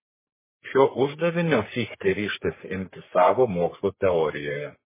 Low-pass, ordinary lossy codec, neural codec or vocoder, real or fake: 3.6 kHz; MP3, 16 kbps; codec, 44.1 kHz, 3.4 kbps, Pupu-Codec; fake